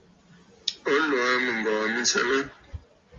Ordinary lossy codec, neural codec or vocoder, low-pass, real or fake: Opus, 32 kbps; none; 7.2 kHz; real